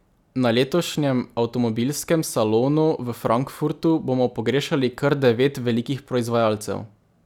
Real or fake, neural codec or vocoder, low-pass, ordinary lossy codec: real; none; 19.8 kHz; none